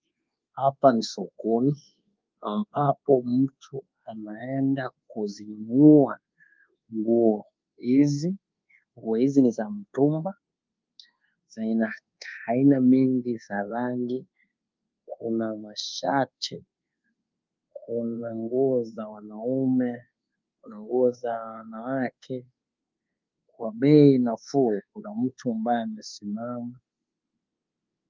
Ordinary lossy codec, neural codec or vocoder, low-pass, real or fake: Opus, 24 kbps; codec, 24 kHz, 1.2 kbps, DualCodec; 7.2 kHz; fake